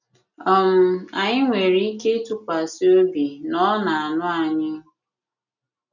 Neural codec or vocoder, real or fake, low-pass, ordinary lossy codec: none; real; 7.2 kHz; none